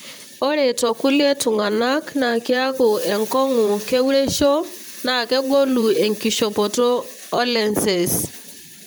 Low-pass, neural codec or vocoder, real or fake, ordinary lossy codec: none; vocoder, 44.1 kHz, 128 mel bands, Pupu-Vocoder; fake; none